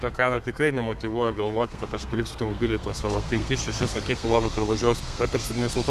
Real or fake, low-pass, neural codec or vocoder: fake; 14.4 kHz; codec, 32 kHz, 1.9 kbps, SNAC